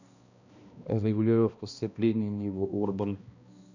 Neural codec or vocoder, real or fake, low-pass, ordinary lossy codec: codec, 16 kHz, 1 kbps, X-Codec, HuBERT features, trained on balanced general audio; fake; 7.2 kHz; none